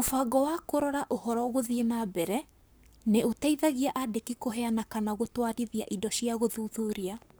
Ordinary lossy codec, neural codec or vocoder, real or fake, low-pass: none; codec, 44.1 kHz, 7.8 kbps, Pupu-Codec; fake; none